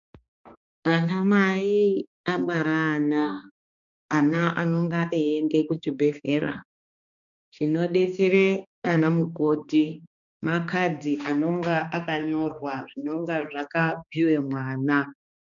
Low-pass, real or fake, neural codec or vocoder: 7.2 kHz; fake; codec, 16 kHz, 2 kbps, X-Codec, HuBERT features, trained on balanced general audio